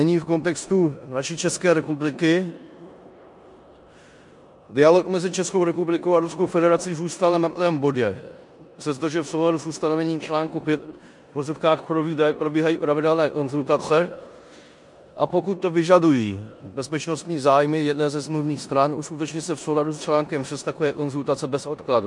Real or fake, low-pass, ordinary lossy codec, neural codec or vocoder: fake; 10.8 kHz; MP3, 64 kbps; codec, 16 kHz in and 24 kHz out, 0.9 kbps, LongCat-Audio-Codec, four codebook decoder